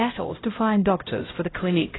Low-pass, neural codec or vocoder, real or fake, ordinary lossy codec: 7.2 kHz; codec, 16 kHz, 0.5 kbps, X-Codec, HuBERT features, trained on LibriSpeech; fake; AAC, 16 kbps